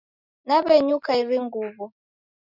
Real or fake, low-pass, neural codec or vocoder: real; 5.4 kHz; none